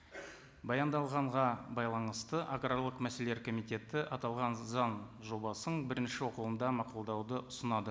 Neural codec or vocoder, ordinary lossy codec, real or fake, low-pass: none; none; real; none